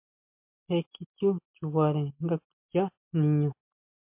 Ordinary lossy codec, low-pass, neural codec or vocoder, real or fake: MP3, 32 kbps; 3.6 kHz; none; real